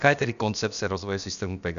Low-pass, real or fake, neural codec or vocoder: 7.2 kHz; fake; codec, 16 kHz, about 1 kbps, DyCAST, with the encoder's durations